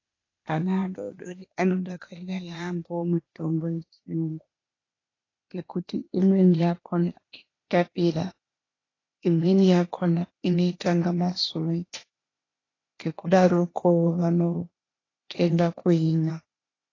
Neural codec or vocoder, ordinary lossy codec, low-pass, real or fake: codec, 16 kHz, 0.8 kbps, ZipCodec; AAC, 32 kbps; 7.2 kHz; fake